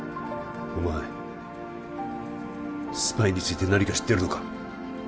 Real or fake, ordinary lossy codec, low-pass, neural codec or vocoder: real; none; none; none